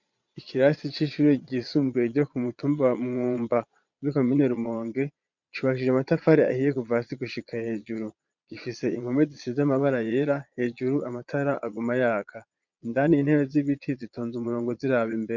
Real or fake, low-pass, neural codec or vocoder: fake; 7.2 kHz; vocoder, 22.05 kHz, 80 mel bands, Vocos